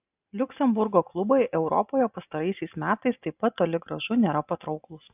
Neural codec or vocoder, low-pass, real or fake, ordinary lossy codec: none; 3.6 kHz; real; AAC, 32 kbps